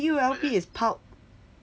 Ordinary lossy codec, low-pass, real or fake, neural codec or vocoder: none; none; real; none